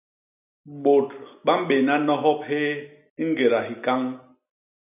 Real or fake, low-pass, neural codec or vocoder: real; 3.6 kHz; none